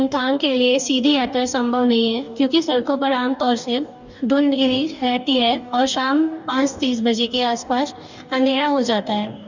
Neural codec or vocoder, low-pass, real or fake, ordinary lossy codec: codec, 44.1 kHz, 2.6 kbps, DAC; 7.2 kHz; fake; none